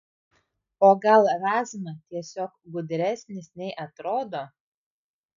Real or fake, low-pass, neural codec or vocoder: real; 7.2 kHz; none